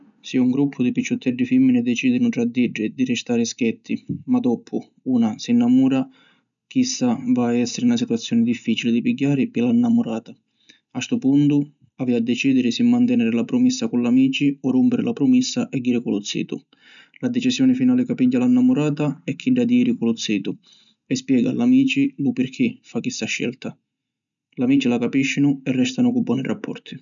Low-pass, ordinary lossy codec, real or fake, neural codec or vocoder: 7.2 kHz; none; real; none